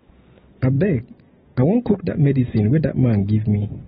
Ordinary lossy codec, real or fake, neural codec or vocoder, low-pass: AAC, 16 kbps; real; none; 19.8 kHz